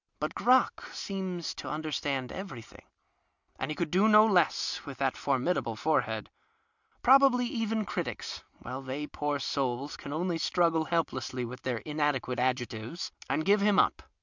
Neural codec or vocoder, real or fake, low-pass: none; real; 7.2 kHz